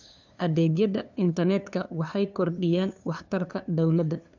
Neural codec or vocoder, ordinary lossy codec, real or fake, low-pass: codec, 16 kHz, 2 kbps, FunCodec, trained on LibriTTS, 25 frames a second; none; fake; 7.2 kHz